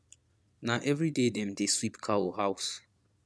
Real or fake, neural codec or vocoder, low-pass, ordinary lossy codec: fake; vocoder, 22.05 kHz, 80 mel bands, Vocos; none; none